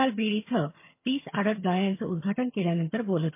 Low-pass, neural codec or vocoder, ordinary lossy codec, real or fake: 3.6 kHz; vocoder, 22.05 kHz, 80 mel bands, HiFi-GAN; MP3, 24 kbps; fake